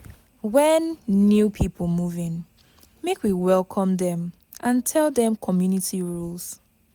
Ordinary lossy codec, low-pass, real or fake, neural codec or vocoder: none; none; real; none